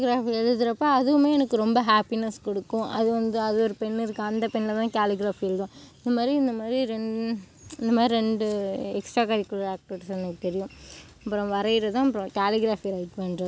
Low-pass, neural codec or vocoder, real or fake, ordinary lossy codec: none; none; real; none